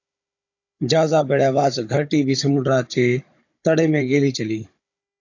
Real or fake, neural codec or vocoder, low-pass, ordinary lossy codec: fake; codec, 16 kHz, 16 kbps, FunCodec, trained on Chinese and English, 50 frames a second; 7.2 kHz; AAC, 48 kbps